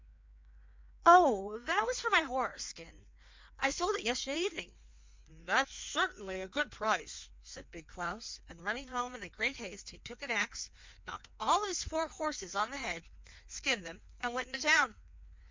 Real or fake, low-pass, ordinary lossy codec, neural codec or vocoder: fake; 7.2 kHz; MP3, 64 kbps; codec, 16 kHz in and 24 kHz out, 1.1 kbps, FireRedTTS-2 codec